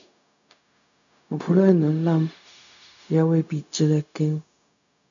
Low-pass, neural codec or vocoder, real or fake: 7.2 kHz; codec, 16 kHz, 0.4 kbps, LongCat-Audio-Codec; fake